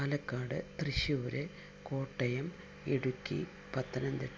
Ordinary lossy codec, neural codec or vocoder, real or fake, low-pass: none; none; real; none